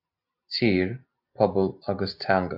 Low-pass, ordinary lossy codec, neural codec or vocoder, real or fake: 5.4 kHz; AAC, 48 kbps; none; real